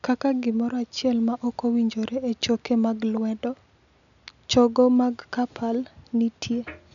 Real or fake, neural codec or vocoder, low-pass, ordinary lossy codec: real; none; 7.2 kHz; none